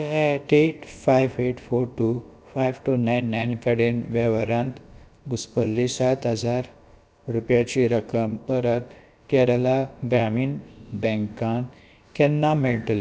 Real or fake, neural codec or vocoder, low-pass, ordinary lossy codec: fake; codec, 16 kHz, about 1 kbps, DyCAST, with the encoder's durations; none; none